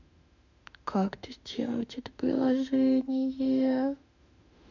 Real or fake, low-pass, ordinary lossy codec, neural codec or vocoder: fake; 7.2 kHz; none; autoencoder, 48 kHz, 32 numbers a frame, DAC-VAE, trained on Japanese speech